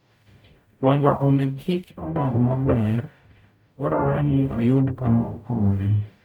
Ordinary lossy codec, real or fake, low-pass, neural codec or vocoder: none; fake; 19.8 kHz; codec, 44.1 kHz, 0.9 kbps, DAC